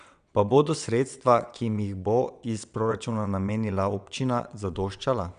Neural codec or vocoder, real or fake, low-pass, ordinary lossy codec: vocoder, 22.05 kHz, 80 mel bands, WaveNeXt; fake; 9.9 kHz; none